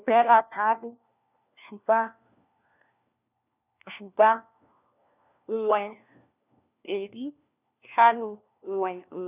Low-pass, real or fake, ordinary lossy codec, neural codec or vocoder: 3.6 kHz; fake; none; codec, 16 kHz, 1 kbps, FunCodec, trained on LibriTTS, 50 frames a second